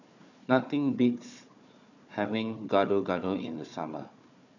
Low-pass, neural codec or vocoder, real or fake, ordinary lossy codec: 7.2 kHz; codec, 16 kHz, 4 kbps, FunCodec, trained on Chinese and English, 50 frames a second; fake; none